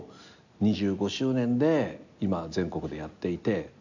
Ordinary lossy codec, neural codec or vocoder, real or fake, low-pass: none; none; real; 7.2 kHz